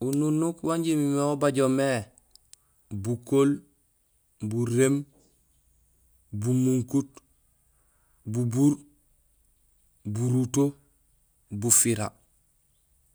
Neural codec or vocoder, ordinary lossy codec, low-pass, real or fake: none; none; none; real